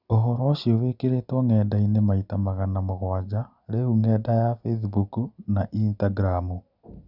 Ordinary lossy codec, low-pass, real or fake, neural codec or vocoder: none; 5.4 kHz; real; none